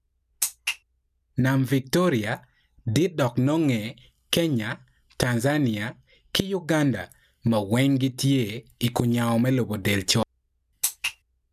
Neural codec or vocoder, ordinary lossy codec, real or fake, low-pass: none; none; real; 14.4 kHz